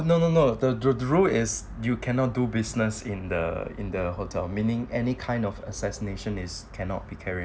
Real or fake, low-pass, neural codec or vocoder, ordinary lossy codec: real; none; none; none